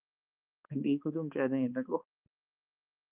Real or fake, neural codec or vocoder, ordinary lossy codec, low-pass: fake; codec, 16 kHz, 4 kbps, X-Codec, HuBERT features, trained on balanced general audio; Opus, 24 kbps; 3.6 kHz